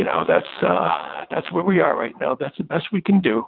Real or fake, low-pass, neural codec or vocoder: fake; 5.4 kHz; vocoder, 22.05 kHz, 80 mel bands, WaveNeXt